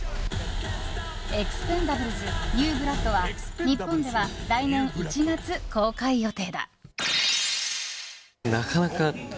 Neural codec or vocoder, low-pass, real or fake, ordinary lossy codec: none; none; real; none